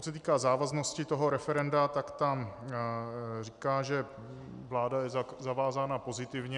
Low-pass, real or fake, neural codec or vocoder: 10.8 kHz; real; none